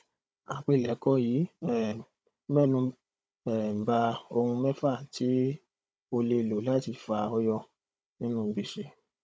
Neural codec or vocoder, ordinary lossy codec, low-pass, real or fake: codec, 16 kHz, 16 kbps, FunCodec, trained on Chinese and English, 50 frames a second; none; none; fake